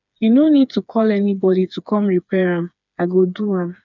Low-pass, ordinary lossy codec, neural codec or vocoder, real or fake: 7.2 kHz; none; codec, 16 kHz, 4 kbps, FreqCodec, smaller model; fake